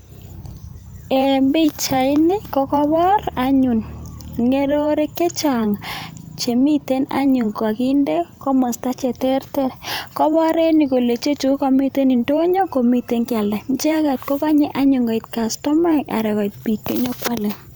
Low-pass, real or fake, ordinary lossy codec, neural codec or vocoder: none; fake; none; vocoder, 44.1 kHz, 128 mel bands every 512 samples, BigVGAN v2